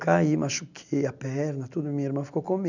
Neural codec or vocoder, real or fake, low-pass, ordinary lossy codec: none; real; 7.2 kHz; none